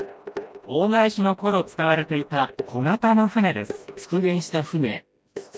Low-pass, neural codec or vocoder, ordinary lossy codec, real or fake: none; codec, 16 kHz, 1 kbps, FreqCodec, smaller model; none; fake